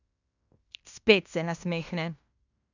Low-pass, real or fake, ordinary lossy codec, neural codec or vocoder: 7.2 kHz; fake; none; codec, 16 kHz in and 24 kHz out, 0.9 kbps, LongCat-Audio-Codec, fine tuned four codebook decoder